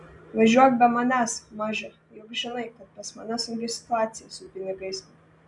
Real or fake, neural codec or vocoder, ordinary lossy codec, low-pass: real; none; MP3, 96 kbps; 10.8 kHz